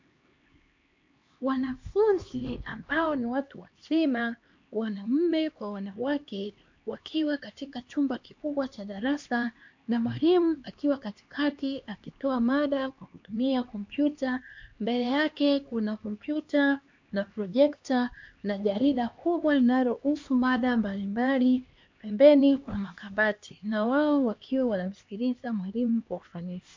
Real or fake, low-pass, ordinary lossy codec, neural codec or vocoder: fake; 7.2 kHz; MP3, 48 kbps; codec, 16 kHz, 2 kbps, X-Codec, HuBERT features, trained on LibriSpeech